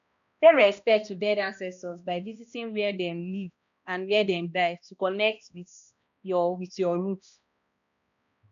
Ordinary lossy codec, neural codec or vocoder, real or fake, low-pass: none; codec, 16 kHz, 1 kbps, X-Codec, HuBERT features, trained on balanced general audio; fake; 7.2 kHz